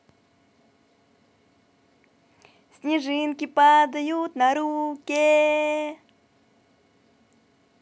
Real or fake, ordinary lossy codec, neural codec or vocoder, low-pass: real; none; none; none